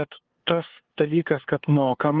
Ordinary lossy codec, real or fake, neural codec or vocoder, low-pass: Opus, 16 kbps; fake; codec, 16 kHz, 2 kbps, FunCodec, trained on LibriTTS, 25 frames a second; 7.2 kHz